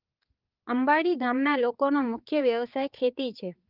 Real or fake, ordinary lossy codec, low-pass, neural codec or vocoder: fake; Opus, 24 kbps; 5.4 kHz; codec, 16 kHz, 4 kbps, X-Codec, HuBERT features, trained on balanced general audio